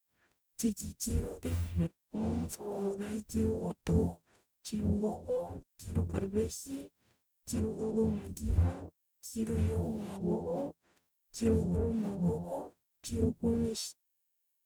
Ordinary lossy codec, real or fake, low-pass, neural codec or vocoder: none; fake; none; codec, 44.1 kHz, 0.9 kbps, DAC